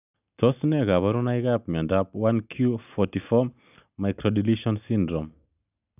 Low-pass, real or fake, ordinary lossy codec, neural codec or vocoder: 3.6 kHz; real; none; none